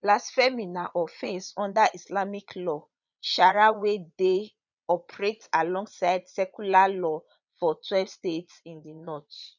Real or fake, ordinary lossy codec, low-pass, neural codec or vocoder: fake; none; 7.2 kHz; vocoder, 22.05 kHz, 80 mel bands, Vocos